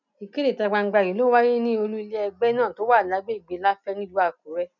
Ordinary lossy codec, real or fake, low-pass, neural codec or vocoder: none; real; 7.2 kHz; none